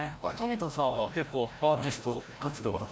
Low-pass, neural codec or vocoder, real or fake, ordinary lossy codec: none; codec, 16 kHz, 0.5 kbps, FreqCodec, larger model; fake; none